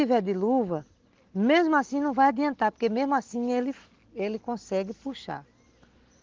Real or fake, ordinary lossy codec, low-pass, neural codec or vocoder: real; Opus, 16 kbps; 7.2 kHz; none